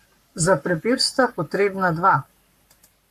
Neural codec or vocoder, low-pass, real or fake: codec, 44.1 kHz, 7.8 kbps, Pupu-Codec; 14.4 kHz; fake